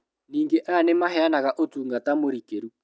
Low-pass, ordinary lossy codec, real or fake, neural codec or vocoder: none; none; real; none